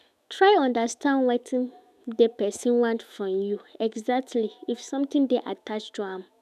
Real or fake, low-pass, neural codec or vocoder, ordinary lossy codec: fake; 14.4 kHz; autoencoder, 48 kHz, 128 numbers a frame, DAC-VAE, trained on Japanese speech; none